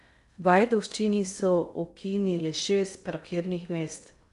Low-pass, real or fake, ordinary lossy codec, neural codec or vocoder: 10.8 kHz; fake; none; codec, 16 kHz in and 24 kHz out, 0.6 kbps, FocalCodec, streaming, 2048 codes